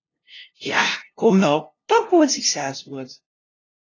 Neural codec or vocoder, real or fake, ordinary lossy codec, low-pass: codec, 16 kHz, 0.5 kbps, FunCodec, trained on LibriTTS, 25 frames a second; fake; AAC, 32 kbps; 7.2 kHz